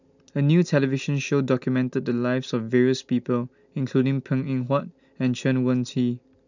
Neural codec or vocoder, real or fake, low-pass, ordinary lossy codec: none; real; 7.2 kHz; none